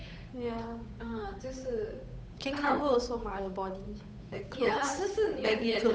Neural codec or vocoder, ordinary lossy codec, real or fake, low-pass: codec, 16 kHz, 8 kbps, FunCodec, trained on Chinese and English, 25 frames a second; none; fake; none